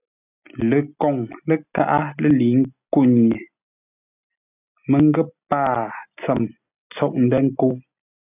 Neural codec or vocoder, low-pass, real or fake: none; 3.6 kHz; real